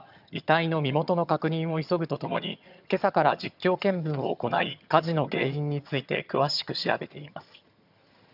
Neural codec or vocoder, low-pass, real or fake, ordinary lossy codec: vocoder, 22.05 kHz, 80 mel bands, HiFi-GAN; 5.4 kHz; fake; none